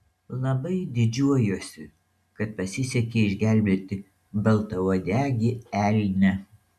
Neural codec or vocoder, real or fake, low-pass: none; real; 14.4 kHz